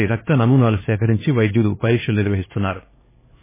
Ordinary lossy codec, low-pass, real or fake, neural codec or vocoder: MP3, 16 kbps; 3.6 kHz; fake; codec, 16 kHz, 2 kbps, X-Codec, HuBERT features, trained on LibriSpeech